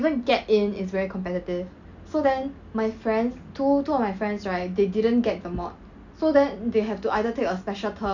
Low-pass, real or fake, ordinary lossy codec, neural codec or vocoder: 7.2 kHz; real; none; none